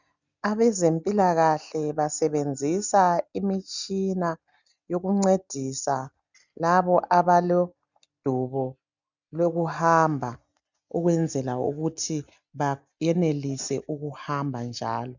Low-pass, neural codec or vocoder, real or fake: 7.2 kHz; none; real